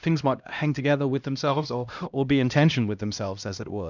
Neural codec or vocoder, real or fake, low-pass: codec, 16 kHz, 1 kbps, X-Codec, HuBERT features, trained on LibriSpeech; fake; 7.2 kHz